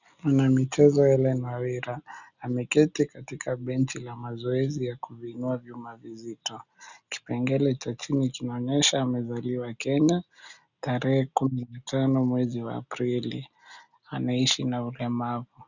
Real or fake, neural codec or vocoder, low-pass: real; none; 7.2 kHz